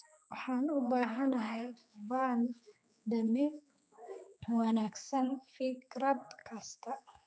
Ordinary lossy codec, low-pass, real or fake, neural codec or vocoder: none; none; fake; codec, 16 kHz, 4 kbps, X-Codec, HuBERT features, trained on general audio